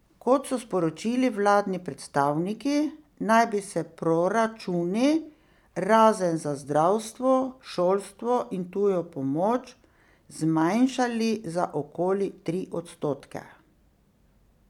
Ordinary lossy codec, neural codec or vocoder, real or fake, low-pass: none; vocoder, 44.1 kHz, 128 mel bands every 256 samples, BigVGAN v2; fake; 19.8 kHz